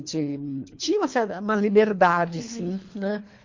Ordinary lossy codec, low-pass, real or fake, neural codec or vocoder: MP3, 48 kbps; 7.2 kHz; fake; codec, 24 kHz, 3 kbps, HILCodec